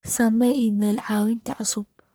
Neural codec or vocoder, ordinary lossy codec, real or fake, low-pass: codec, 44.1 kHz, 1.7 kbps, Pupu-Codec; none; fake; none